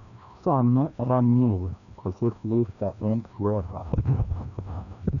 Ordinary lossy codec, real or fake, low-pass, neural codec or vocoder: none; fake; 7.2 kHz; codec, 16 kHz, 1 kbps, FreqCodec, larger model